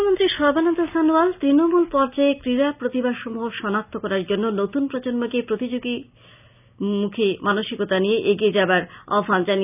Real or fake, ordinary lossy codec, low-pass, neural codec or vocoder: real; none; 3.6 kHz; none